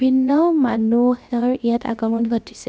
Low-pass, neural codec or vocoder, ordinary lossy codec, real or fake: none; codec, 16 kHz, about 1 kbps, DyCAST, with the encoder's durations; none; fake